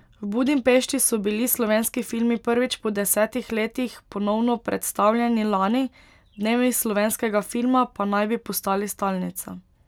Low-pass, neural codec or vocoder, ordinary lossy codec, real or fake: 19.8 kHz; none; none; real